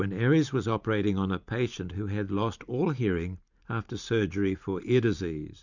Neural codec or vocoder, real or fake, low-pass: vocoder, 44.1 kHz, 128 mel bands every 512 samples, BigVGAN v2; fake; 7.2 kHz